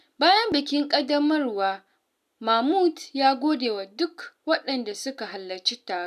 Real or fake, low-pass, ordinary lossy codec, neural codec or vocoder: real; 14.4 kHz; none; none